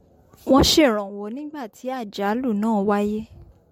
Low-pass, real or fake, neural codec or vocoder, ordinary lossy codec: 19.8 kHz; real; none; MP3, 64 kbps